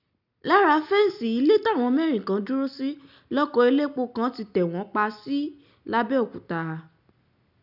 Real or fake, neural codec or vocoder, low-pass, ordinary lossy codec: real; none; 5.4 kHz; none